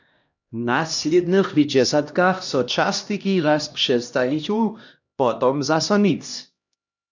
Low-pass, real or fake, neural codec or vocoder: 7.2 kHz; fake; codec, 16 kHz, 1 kbps, X-Codec, HuBERT features, trained on LibriSpeech